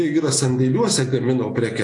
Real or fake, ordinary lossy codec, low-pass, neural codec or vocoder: real; AAC, 48 kbps; 10.8 kHz; none